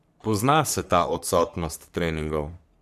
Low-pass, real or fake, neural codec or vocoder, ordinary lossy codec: 14.4 kHz; fake; codec, 44.1 kHz, 3.4 kbps, Pupu-Codec; none